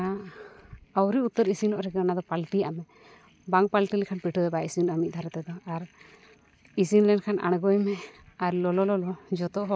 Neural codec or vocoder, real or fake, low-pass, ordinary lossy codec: none; real; none; none